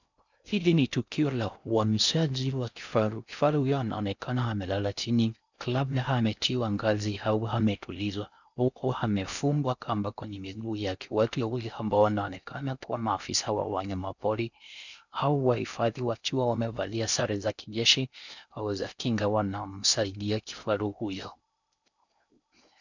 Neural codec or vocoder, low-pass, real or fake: codec, 16 kHz in and 24 kHz out, 0.6 kbps, FocalCodec, streaming, 2048 codes; 7.2 kHz; fake